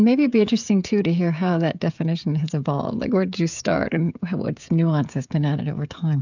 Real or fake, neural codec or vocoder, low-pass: fake; codec, 16 kHz, 16 kbps, FreqCodec, smaller model; 7.2 kHz